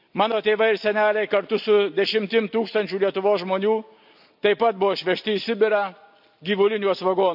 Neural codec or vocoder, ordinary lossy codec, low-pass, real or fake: none; AAC, 48 kbps; 5.4 kHz; real